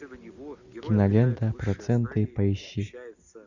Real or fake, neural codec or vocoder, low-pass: real; none; 7.2 kHz